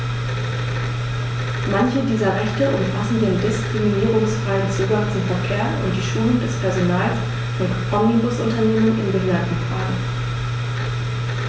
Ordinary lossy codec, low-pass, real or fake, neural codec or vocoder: none; none; real; none